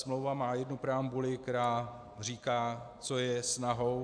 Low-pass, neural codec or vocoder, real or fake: 9.9 kHz; none; real